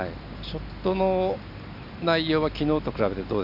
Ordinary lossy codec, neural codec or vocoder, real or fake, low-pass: none; none; real; 5.4 kHz